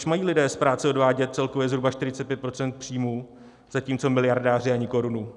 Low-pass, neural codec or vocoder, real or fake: 10.8 kHz; none; real